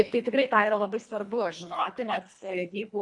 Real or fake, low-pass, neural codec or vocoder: fake; 10.8 kHz; codec, 24 kHz, 1.5 kbps, HILCodec